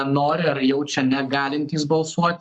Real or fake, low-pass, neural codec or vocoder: fake; 10.8 kHz; codec, 44.1 kHz, 7.8 kbps, Pupu-Codec